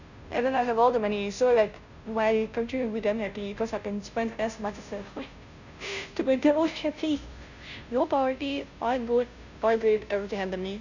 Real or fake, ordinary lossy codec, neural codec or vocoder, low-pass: fake; none; codec, 16 kHz, 0.5 kbps, FunCodec, trained on Chinese and English, 25 frames a second; 7.2 kHz